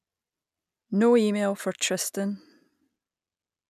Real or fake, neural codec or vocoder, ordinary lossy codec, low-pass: real; none; none; 14.4 kHz